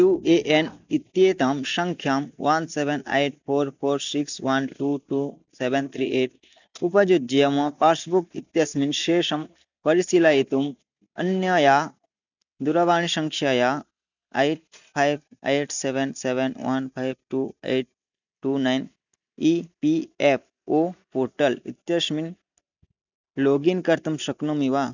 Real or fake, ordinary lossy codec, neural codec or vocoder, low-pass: real; none; none; 7.2 kHz